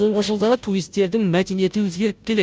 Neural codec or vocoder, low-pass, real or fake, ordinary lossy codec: codec, 16 kHz, 0.5 kbps, FunCodec, trained on Chinese and English, 25 frames a second; none; fake; none